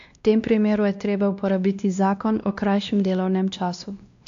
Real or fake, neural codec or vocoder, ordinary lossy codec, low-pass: fake; codec, 16 kHz, 1 kbps, X-Codec, WavLM features, trained on Multilingual LibriSpeech; AAC, 64 kbps; 7.2 kHz